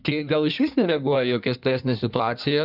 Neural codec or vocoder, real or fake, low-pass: codec, 16 kHz in and 24 kHz out, 1.1 kbps, FireRedTTS-2 codec; fake; 5.4 kHz